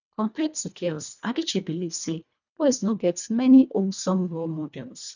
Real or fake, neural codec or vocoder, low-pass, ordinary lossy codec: fake; codec, 24 kHz, 1.5 kbps, HILCodec; 7.2 kHz; none